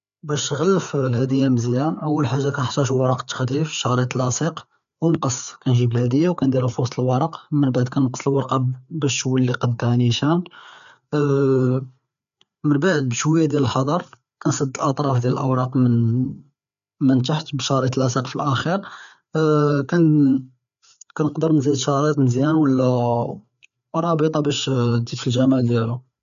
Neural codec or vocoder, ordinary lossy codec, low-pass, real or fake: codec, 16 kHz, 4 kbps, FreqCodec, larger model; none; 7.2 kHz; fake